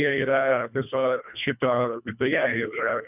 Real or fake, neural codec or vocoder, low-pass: fake; codec, 24 kHz, 1.5 kbps, HILCodec; 3.6 kHz